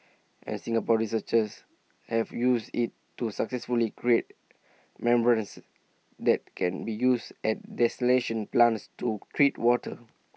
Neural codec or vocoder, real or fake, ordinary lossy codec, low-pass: none; real; none; none